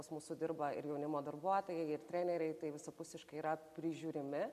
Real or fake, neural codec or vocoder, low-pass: real; none; 14.4 kHz